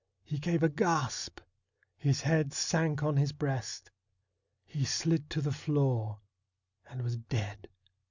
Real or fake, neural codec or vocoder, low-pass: real; none; 7.2 kHz